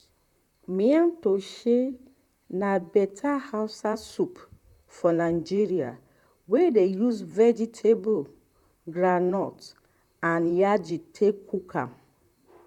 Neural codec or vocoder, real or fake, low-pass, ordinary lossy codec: vocoder, 44.1 kHz, 128 mel bands, Pupu-Vocoder; fake; 19.8 kHz; none